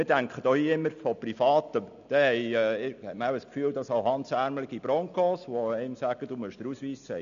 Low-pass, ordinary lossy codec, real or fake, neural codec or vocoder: 7.2 kHz; none; real; none